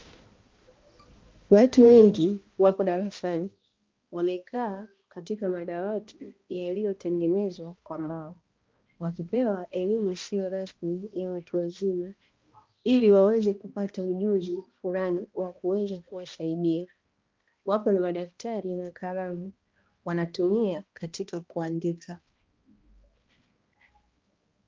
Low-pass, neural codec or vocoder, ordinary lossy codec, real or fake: 7.2 kHz; codec, 16 kHz, 1 kbps, X-Codec, HuBERT features, trained on balanced general audio; Opus, 32 kbps; fake